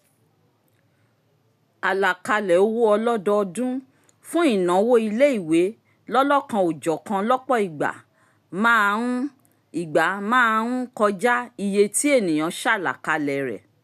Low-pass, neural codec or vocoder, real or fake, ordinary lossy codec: 14.4 kHz; none; real; none